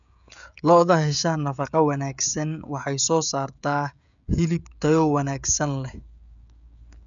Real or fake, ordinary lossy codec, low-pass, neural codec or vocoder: fake; none; 7.2 kHz; codec, 16 kHz, 16 kbps, FreqCodec, smaller model